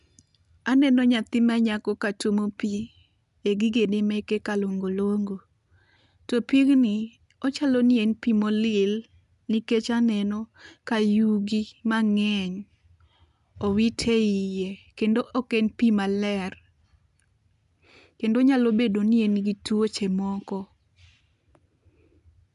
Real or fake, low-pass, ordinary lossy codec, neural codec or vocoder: real; 10.8 kHz; none; none